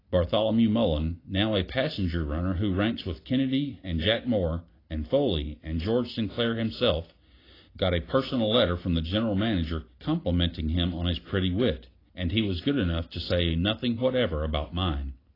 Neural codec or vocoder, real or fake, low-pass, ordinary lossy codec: none; real; 5.4 kHz; AAC, 24 kbps